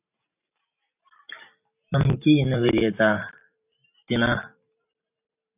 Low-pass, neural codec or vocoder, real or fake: 3.6 kHz; vocoder, 44.1 kHz, 128 mel bands every 512 samples, BigVGAN v2; fake